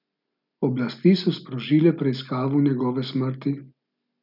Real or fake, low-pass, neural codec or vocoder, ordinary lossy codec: real; 5.4 kHz; none; none